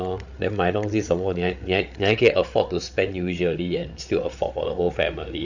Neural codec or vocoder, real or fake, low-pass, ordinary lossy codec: codec, 16 kHz, 8 kbps, FreqCodec, larger model; fake; 7.2 kHz; none